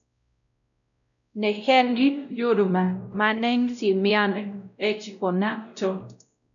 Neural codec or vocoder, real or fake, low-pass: codec, 16 kHz, 0.5 kbps, X-Codec, WavLM features, trained on Multilingual LibriSpeech; fake; 7.2 kHz